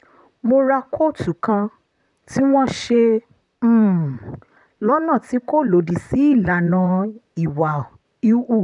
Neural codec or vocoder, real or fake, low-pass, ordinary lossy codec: vocoder, 44.1 kHz, 128 mel bands, Pupu-Vocoder; fake; 10.8 kHz; none